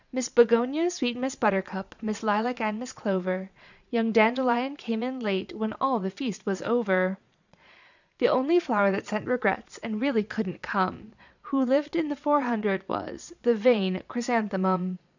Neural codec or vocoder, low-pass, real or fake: vocoder, 22.05 kHz, 80 mel bands, Vocos; 7.2 kHz; fake